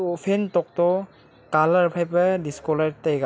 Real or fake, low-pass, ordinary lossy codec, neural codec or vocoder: real; none; none; none